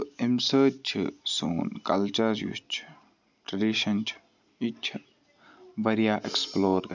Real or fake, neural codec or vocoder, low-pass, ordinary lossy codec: real; none; 7.2 kHz; none